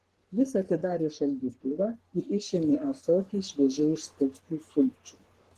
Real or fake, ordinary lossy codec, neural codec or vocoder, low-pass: fake; Opus, 16 kbps; codec, 44.1 kHz, 3.4 kbps, Pupu-Codec; 14.4 kHz